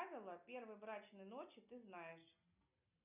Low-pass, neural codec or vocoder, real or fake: 3.6 kHz; none; real